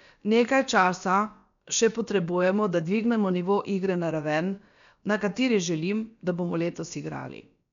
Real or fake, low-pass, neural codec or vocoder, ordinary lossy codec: fake; 7.2 kHz; codec, 16 kHz, about 1 kbps, DyCAST, with the encoder's durations; none